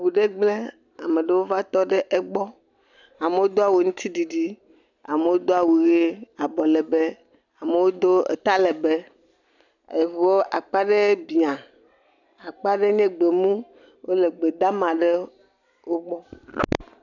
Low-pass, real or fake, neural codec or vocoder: 7.2 kHz; real; none